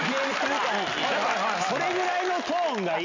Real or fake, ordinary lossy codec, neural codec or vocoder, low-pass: real; MP3, 64 kbps; none; 7.2 kHz